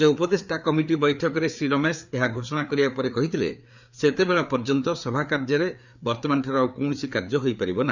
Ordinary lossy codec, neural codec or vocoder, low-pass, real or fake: none; codec, 16 kHz, 4 kbps, FreqCodec, larger model; 7.2 kHz; fake